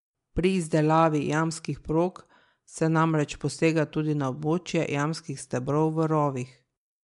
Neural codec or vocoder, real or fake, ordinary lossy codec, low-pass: none; real; MP3, 64 kbps; 10.8 kHz